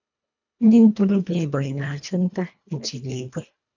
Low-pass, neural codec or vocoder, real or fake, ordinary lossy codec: 7.2 kHz; codec, 24 kHz, 1.5 kbps, HILCodec; fake; none